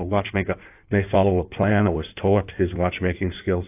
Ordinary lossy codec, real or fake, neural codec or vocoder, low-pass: AAC, 32 kbps; fake; codec, 16 kHz in and 24 kHz out, 1.1 kbps, FireRedTTS-2 codec; 3.6 kHz